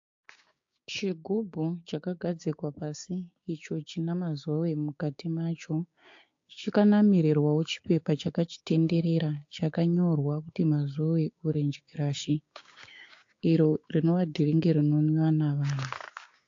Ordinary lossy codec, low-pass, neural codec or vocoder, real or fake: AAC, 48 kbps; 7.2 kHz; codec, 16 kHz, 6 kbps, DAC; fake